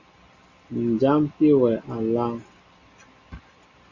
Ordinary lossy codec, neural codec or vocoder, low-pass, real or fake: Opus, 64 kbps; none; 7.2 kHz; real